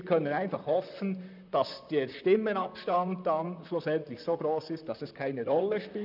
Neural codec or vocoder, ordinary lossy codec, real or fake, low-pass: vocoder, 44.1 kHz, 128 mel bands, Pupu-Vocoder; none; fake; 5.4 kHz